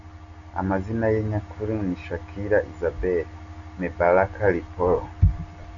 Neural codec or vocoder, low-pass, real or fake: none; 7.2 kHz; real